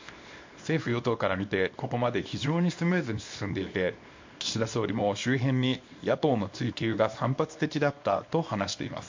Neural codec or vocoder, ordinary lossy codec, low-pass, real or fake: codec, 24 kHz, 0.9 kbps, WavTokenizer, small release; MP3, 48 kbps; 7.2 kHz; fake